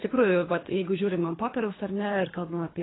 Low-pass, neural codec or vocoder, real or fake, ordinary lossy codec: 7.2 kHz; codec, 24 kHz, 3 kbps, HILCodec; fake; AAC, 16 kbps